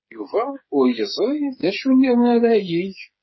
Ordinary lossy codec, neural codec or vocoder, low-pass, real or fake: MP3, 24 kbps; codec, 16 kHz, 8 kbps, FreqCodec, smaller model; 7.2 kHz; fake